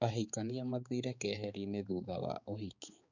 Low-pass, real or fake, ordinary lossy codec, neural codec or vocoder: 7.2 kHz; fake; none; codec, 16 kHz, 4 kbps, X-Codec, HuBERT features, trained on balanced general audio